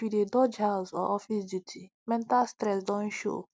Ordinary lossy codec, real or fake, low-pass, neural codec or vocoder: none; real; none; none